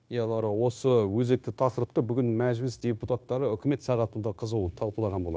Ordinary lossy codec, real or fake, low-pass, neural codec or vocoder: none; fake; none; codec, 16 kHz, 0.9 kbps, LongCat-Audio-Codec